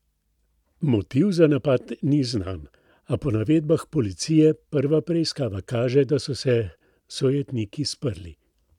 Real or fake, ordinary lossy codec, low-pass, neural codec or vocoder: real; none; 19.8 kHz; none